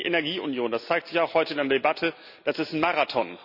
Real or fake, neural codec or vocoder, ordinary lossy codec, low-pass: real; none; none; 5.4 kHz